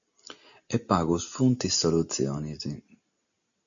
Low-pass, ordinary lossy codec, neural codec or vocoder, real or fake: 7.2 kHz; MP3, 96 kbps; none; real